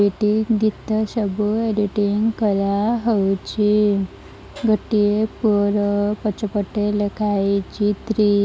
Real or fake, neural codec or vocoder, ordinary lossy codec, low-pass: real; none; none; none